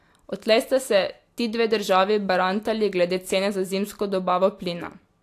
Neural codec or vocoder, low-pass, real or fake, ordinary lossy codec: none; 14.4 kHz; real; AAC, 64 kbps